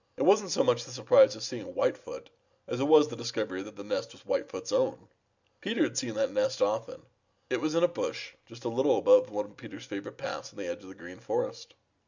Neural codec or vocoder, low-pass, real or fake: none; 7.2 kHz; real